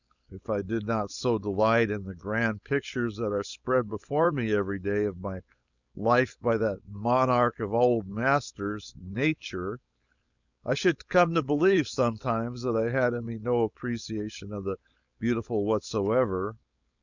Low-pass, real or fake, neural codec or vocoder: 7.2 kHz; fake; codec, 16 kHz, 4.8 kbps, FACodec